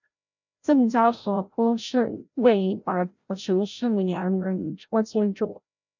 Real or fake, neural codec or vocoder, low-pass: fake; codec, 16 kHz, 0.5 kbps, FreqCodec, larger model; 7.2 kHz